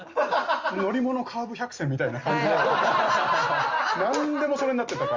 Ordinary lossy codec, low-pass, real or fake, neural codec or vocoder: Opus, 32 kbps; 7.2 kHz; real; none